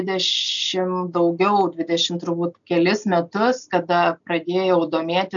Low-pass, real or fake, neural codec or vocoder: 7.2 kHz; real; none